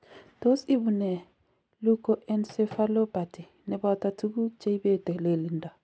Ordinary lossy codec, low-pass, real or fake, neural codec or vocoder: none; none; real; none